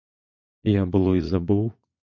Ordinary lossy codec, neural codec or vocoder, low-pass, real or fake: MP3, 48 kbps; vocoder, 22.05 kHz, 80 mel bands, Vocos; 7.2 kHz; fake